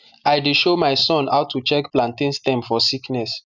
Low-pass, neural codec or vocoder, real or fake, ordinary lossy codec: 7.2 kHz; none; real; none